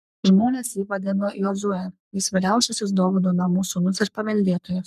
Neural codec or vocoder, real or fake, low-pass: codec, 44.1 kHz, 3.4 kbps, Pupu-Codec; fake; 14.4 kHz